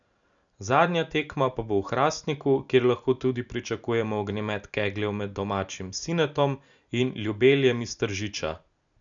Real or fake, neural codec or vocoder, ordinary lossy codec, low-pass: real; none; none; 7.2 kHz